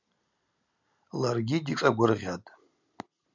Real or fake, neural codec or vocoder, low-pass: real; none; 7.2 kHz